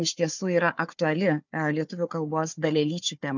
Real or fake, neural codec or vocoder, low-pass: fake; codec, 16 kHz, 4 kbps, FunCodec, trained on Chinese and English, 50 frames a second; 7.2 kHz